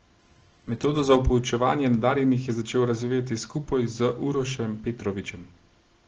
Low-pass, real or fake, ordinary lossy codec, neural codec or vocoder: 7.2 kHz; real; Opus, 16 kbps; none